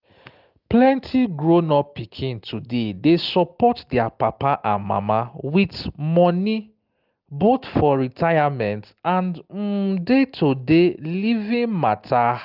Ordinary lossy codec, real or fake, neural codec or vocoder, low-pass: Opus, 32 kbps; real; none; 5.4 kHz